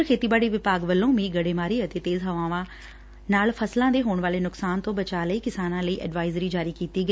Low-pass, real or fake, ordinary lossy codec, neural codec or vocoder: none; real; none; none